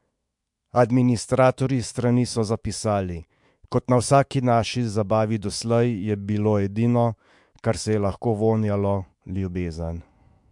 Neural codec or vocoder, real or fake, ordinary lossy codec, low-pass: autoencoder, 48 kHz, 128 numbers a frame, DAC-VAE, trained on Japanese speech; fake; MP3, 64 kbps; 10.8 kHz